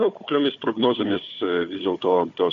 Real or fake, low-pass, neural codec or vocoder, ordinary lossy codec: fake; 7.2 kHz; codec, 16 kHz, 4 kbps, FunCodec, trained on Chinese and English, 50 frames a second; AAC, 48 kbps